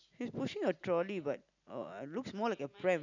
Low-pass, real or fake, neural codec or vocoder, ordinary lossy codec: 7.2 kHz; real; none; none